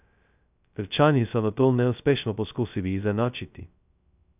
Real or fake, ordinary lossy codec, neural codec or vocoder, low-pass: fake; none; codec, 16 kHz, 0.2 kbps, FocalCodec; 3.6 kHz